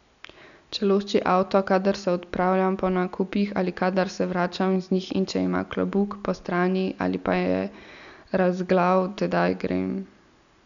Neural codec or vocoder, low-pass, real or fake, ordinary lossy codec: none; 7.2 kHz; real; none